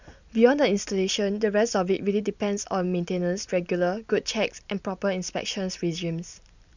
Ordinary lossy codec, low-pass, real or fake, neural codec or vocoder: none; 7.2 kHz; real; none